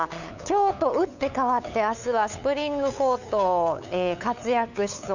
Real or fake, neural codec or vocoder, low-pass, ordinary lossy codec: fake; codec, 16 kHz, 8 kbps, FunCodec, trained on LibriTTS, 25 frames a second; 7.2 kHz; none